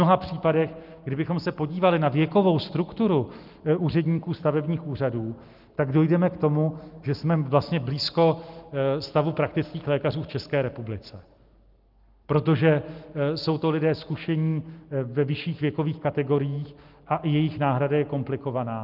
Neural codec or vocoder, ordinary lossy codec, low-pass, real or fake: none; Opus, 32 kbps; 5.4 kHz; real